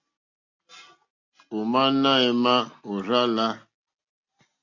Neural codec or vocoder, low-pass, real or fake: none; 7.2 kHz; real